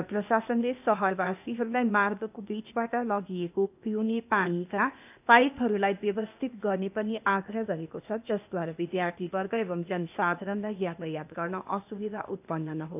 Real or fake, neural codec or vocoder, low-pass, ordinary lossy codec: fake; codec, 16 kHz, 0.8 kbps, ZipCodec; 3.6 kHz; none